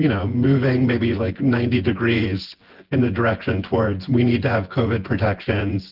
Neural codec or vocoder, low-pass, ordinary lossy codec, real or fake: vocoder, 24 kHz, 100 mel bands, Vocos; 5.4 kHz; Opus, 16 kbps; fake